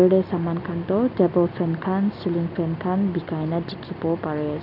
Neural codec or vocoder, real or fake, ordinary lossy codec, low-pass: none; real; none; 5.4 kHz